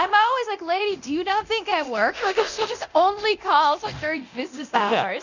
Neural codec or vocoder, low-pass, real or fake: codec, 24 kHz, 0.9 kbps, DualCodec; 7.2 kHz; fake